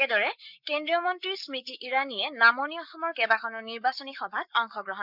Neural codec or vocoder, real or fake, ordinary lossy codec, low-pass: codec, 44.1 kHz, 7.8 kbps, Pupu-Codec; fake; none; 5.4 kHz